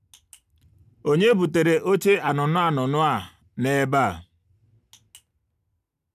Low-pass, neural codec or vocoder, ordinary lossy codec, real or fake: 14.4 kHz; none; none; real